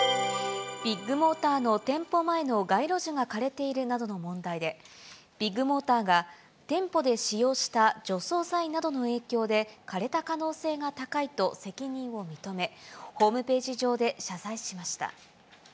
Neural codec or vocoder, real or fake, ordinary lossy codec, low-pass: none; real; none; none